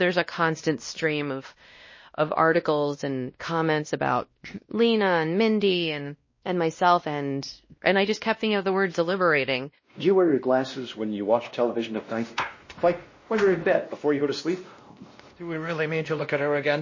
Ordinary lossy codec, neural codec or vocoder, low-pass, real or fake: MP3, 32 kbps; codec, 16 kHz, 1 kbps, X-Codec, WavLM features, trained on Multilingual LibriSpeech; 7.2 kHz; fake